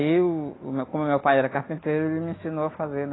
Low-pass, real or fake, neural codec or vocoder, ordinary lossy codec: 7.2 kHz; real; none; AAC, 16 kbps